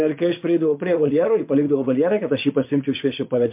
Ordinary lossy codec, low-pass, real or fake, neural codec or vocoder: MP3, 32 kbps; 3.6 kHz; fake; vocoder, 22.05 kHz, 80 mel bands, Vocos